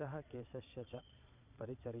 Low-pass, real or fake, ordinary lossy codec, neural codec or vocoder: 3.6 kHz; fake; none; vocoder, 22.05 kHz, 80 mel bands, Vocos